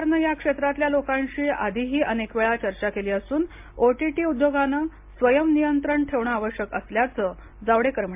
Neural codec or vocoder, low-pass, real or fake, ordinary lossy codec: none; 3.6 kHz; real; MP3, 32 kbps